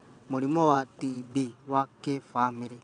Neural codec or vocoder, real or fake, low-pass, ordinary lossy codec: vocoder, 22.05 kHz, 80 mel bands, WaveNeXt; fake; 9.9 kHz; none